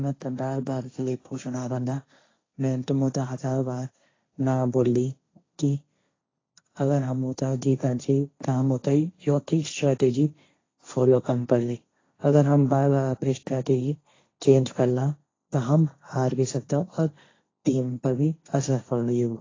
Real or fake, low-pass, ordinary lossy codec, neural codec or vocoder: fake; 7.2 kHz; AAC, 32 kbps; codec, 16 kHz, 1.1 kbps, Voila-Tokenizer